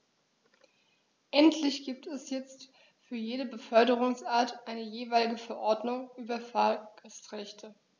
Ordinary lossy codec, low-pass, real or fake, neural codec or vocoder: none; none; real; none